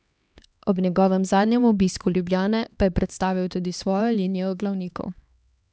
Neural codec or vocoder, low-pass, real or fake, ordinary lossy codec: codec, 16 kHz, 2 kbps, X-Codec, HuBERT features, trained on LibriSpeech; none; fake; none